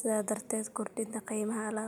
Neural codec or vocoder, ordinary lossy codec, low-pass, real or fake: none; none; 19.8 kHz; real